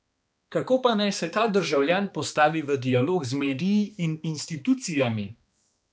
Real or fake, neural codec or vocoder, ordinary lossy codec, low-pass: fake; codec, 16 kHz, 2 kbps, X-Codec, HuBERT features, trained on balanced general audio; none; none